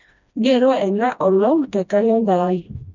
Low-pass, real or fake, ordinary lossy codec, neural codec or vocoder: 7.2 kHz; fake; none; codec, 16 kHz, 1 kbps, FreqCodec, smaller model